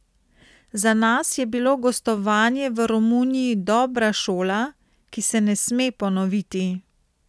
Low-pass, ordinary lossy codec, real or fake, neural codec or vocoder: none; none; real; none